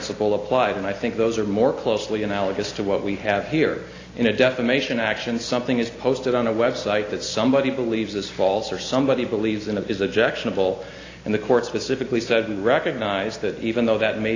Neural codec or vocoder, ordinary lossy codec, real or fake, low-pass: none; AAC, 32 kbps; real; 7.2 kHz